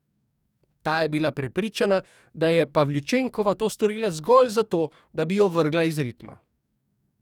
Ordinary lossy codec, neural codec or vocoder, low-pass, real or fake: none; codec, 44.1 kHz, 2.6 kbps, DAC; 19.8 kHz; fake